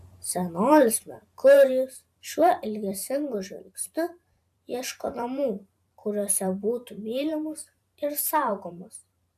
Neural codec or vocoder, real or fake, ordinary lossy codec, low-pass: vocoder, 44.1 kHz, 128 mel bands every 512 samples, BigVGAN v2; fake; AAC, 96 kbps; 14.4 kHz